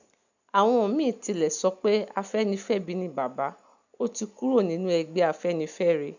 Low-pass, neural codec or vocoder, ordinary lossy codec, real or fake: 7.2 kHz; none; none; real